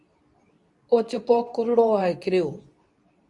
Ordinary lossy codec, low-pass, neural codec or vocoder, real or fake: Opus, 64 kbps; 10.8 kHz; codec, 24 kHz, 0.9 kbps, WavTokenizer, medium speech release version 2; fake